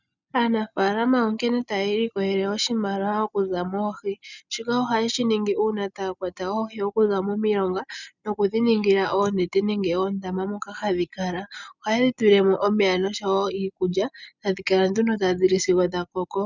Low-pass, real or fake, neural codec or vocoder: 7.2 kHz; real; none